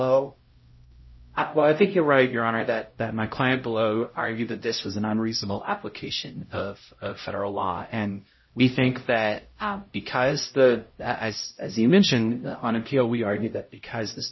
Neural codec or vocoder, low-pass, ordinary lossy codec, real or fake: codec, 16 kHz, 0.5 kbps, X-Codec, HuBERT features, trained on LibriSpeech; 7.2 kHz; MP3, 24 kbps; fake